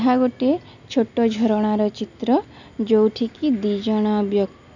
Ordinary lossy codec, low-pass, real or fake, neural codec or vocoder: none; 7.2 kHz; real; none